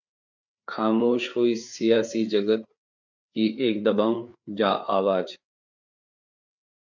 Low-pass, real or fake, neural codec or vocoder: 7.2 kHz; fake; codec, 16 kHz, 4 kbps, FreqCodec, larger model